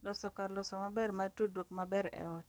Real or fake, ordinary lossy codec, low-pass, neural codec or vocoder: fake; none; none; codec, 44.1 kHz, 7.8 kbps, DAC